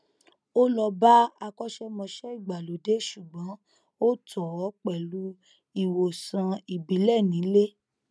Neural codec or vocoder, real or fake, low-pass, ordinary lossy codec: none; real; none; none